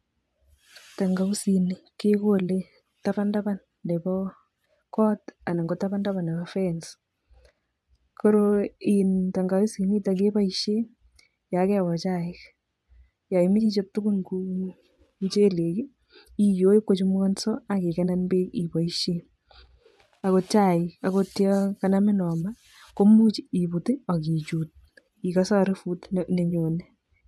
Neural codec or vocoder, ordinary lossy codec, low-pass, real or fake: vocoder, 24 kHz, 100 mel bands, Vocos; none; none; fake